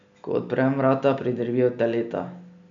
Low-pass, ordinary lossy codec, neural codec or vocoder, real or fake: 7.2 kHz; none; none; real